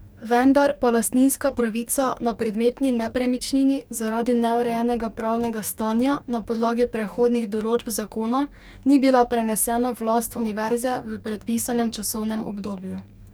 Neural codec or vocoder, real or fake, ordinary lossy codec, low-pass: codec, 44.1 kHz, 2.6 kbps, DAC; fake; none; none